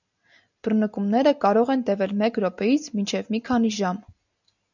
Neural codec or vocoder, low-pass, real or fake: none; 7.2 kHz; real